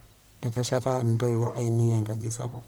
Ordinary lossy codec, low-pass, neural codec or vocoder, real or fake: none; none; codec, 44.1 kHz, 1.7 kbps, Pupu-Codec; fake